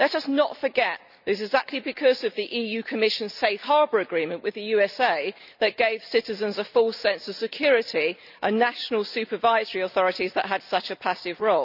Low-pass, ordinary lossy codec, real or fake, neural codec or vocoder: 5.4 kHz; none; real; none